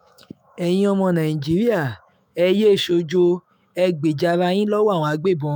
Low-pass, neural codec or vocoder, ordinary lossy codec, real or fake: none; autoencoder, 48 kHz, 128 numbers a frame, DAC-VAE, trained on Japanese speech; none; fake